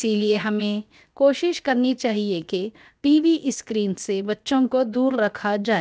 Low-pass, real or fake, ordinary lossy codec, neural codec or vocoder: none; fake; none; codec, 16 kHz, 0.7 kbps, FocalCodec